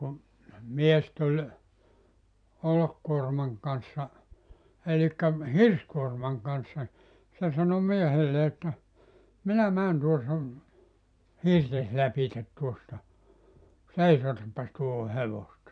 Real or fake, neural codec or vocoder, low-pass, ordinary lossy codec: fake; vocoder, 44.1 kHz, 128 mel bands every 256 samples, BigVGAN v2; 9.9 kHz; none